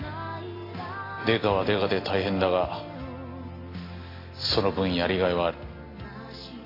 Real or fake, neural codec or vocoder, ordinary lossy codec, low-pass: real; none; AAC, 24 kbps; 5.4 kHz